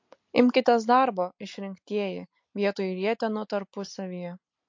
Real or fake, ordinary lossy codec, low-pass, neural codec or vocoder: real; MP3, 48 kbps; 7.2 kHz; none